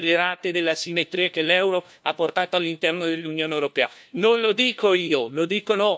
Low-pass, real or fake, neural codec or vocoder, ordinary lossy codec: none; fake; codec, 16 kHz, 1 kbps, FunCodec, trained on LibriTTS, 50 frames a second; none